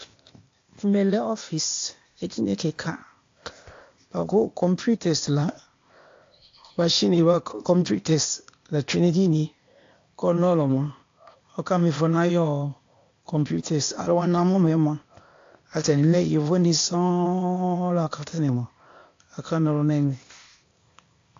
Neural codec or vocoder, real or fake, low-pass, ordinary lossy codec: codec, 16 kHz, 0.8 kbps, ZipCodec; fake; 7.2 kHz; AAC, 48 kbps